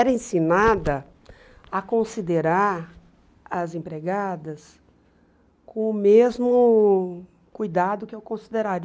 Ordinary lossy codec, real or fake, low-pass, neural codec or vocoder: none; real; none; none